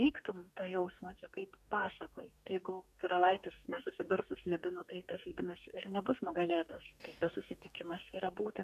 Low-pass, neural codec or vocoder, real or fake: 14.4 kHz; codec, 44.1 kHz, 2.6 kbps, DAC; fake